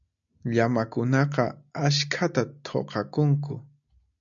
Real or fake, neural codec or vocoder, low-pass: real; none; 7.2 kHz